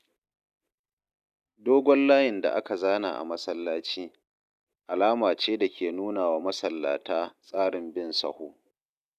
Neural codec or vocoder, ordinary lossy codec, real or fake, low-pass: none; none; real; 14.4 kHz